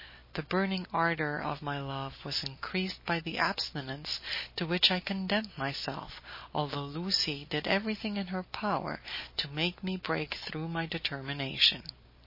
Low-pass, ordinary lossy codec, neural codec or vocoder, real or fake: 5.4 kHz; MP3, 24 kbps; none; real